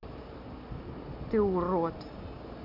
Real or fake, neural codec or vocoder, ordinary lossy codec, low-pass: real; none; none; 5.4 kHz